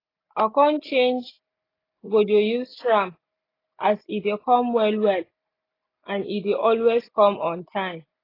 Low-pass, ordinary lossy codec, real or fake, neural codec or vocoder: 5.4 kHz; AAC, 24 kbps; real; none